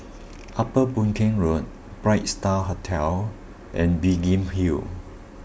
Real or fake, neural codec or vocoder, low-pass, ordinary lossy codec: real; none; none; none